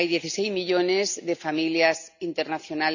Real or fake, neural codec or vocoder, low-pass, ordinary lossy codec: real; none; 7.2 kHz; none